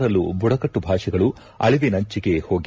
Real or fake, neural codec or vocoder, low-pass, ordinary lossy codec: real; none; none; none